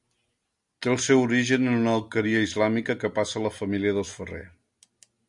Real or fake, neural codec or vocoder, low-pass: real; none; 10.8 kHz